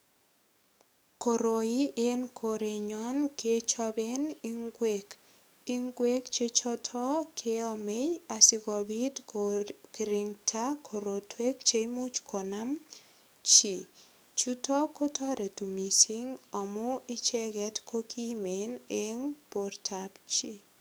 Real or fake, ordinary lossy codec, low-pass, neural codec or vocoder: fake; none; none; codec, 44.1 kHz, 7.8 kbps, DAC